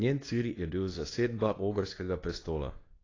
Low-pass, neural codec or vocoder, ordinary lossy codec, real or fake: 7.2 kHz; codec, 24 kHz, 0.9 kbps, WavTokenizer, medium speech release version 2; AAC, 32 kbps; fake